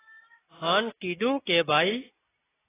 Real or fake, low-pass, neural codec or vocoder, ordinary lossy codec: real; 3.6 kHz; none; AAC, 16 kbps